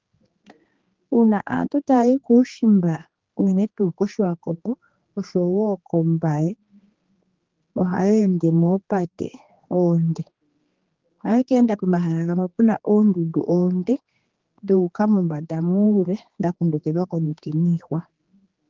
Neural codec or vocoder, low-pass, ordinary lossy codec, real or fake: codec, 16 kHz, 2 kbps, X-Codec, HuBERT features, trained on general audio; 7.2 kHz; Opus, 16 kbps; fake